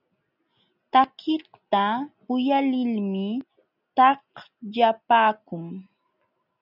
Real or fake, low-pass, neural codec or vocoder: real; 5.4 kHz; none